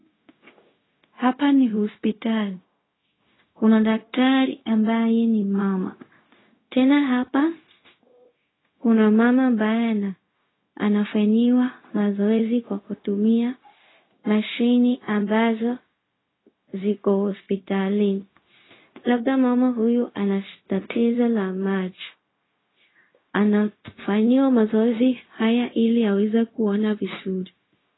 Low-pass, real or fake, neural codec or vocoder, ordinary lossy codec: 7.2 kHz; fake; codec, 16 kHz, 0.4 kbps, LongCat-Audio-Codec; AAC, 16 kbps